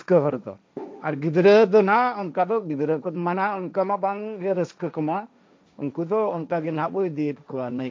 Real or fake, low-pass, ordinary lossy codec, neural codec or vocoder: fake; none; none; codec, 16 kHz, 1.1 kbps, Voila-Tokenizer